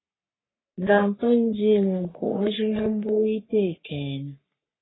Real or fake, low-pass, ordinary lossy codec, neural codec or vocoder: fake; 7.2 kHz; AAC, 16 kbps; codec, 44.1 kHz, 3.4 kbps, Pupu-Codec